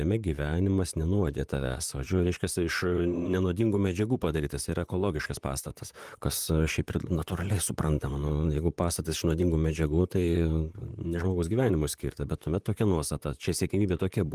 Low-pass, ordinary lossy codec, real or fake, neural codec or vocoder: 14.4 kHz; Opus, 32 kbps; fake; vocoder, 44.1 kHz, 128 mel bands, Pupu-Vocoder